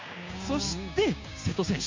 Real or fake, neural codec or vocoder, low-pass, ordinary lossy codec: fake; codec, 16 kHz, 6 kbps, DAC; 7.2 kHz; MP3, 48 kbps